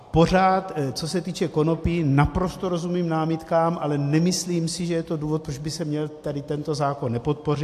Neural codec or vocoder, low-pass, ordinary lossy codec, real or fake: none; 14.4 kHz; AAC, 64 kbps; real